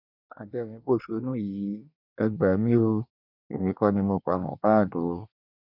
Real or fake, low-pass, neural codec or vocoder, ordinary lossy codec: fake; 5.4 kHz; codec, 24 kHz, 1 kbps, SNAC; none